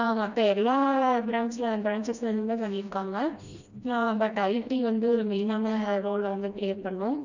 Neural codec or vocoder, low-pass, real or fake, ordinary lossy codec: codec, 16 kHz, 1 kbps, FreqCodec, smaller model; 7.2 kHz; fake; none